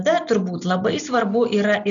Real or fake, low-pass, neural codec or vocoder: real; 7.2 kHz; none